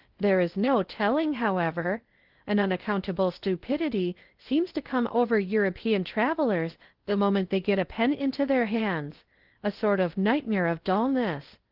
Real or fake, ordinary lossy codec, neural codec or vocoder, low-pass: fake; Opus, 16 kbps; codec, 16 kHz in and 24 kHz out, 0.6 kbps, FocalCodec, streaming, 2048 codes; 5.4 kHz